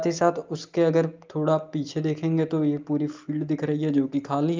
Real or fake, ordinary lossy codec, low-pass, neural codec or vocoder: real; Opus, 24 kbps; 7.2 kHz; none